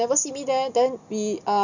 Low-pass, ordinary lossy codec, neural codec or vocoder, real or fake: 7.2 kHz; none; none; real